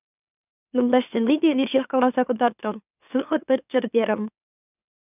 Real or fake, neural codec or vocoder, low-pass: fake; autoencoder, 44.1 kHz, a latent of 192 numbers a frame, MeloTTS; 3.6 kHz